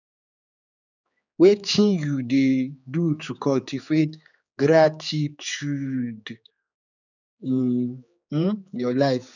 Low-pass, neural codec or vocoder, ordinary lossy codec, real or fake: 7.2 kHz; codec, 16 kHz, 4 kbps, X-Codec, HuBERT features, trained on general audio; none; fake